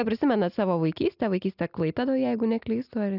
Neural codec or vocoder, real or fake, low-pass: none; real; 5.4 kHz